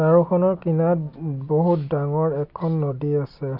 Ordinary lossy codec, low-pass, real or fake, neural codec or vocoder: none; 5.4 kHz; real; none